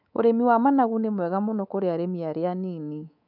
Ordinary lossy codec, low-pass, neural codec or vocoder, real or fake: none; 5.4 kHz; codec, 24 kHz, 3.1 kbps, DualCodec; fake